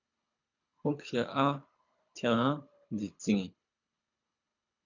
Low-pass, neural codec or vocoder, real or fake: 7.2 kHz; codec, 24 kHz, 3 kbps, HILCodec; fake